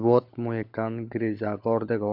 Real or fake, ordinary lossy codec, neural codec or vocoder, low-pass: fake; MP3, 48 kbps; codec, 16 kHz, 16 kbps, FunCodec, trained on LibriTTS, 50 frames a second; 5.4 kHz